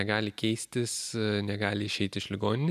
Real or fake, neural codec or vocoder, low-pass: real; none; 14.4 kHz